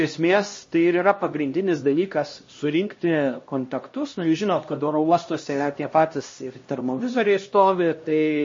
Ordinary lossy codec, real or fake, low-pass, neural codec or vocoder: MP3, 32 kbps; fake; 7.2 kHz; codec, 16 kHz, 1 kbps, X-Codec, HuBERT features, trained on LibriSpeech